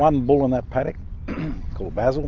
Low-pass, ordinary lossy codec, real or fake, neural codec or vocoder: 7.2 kHz; Opus, 32 kbps; real; none